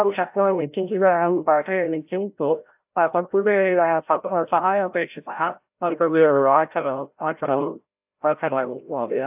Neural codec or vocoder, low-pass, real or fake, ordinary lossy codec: codec, 16 kHz, 0.5 kbps, FreqCodec, larger model; 3.6 kHz; fake; AAC, 32 kbps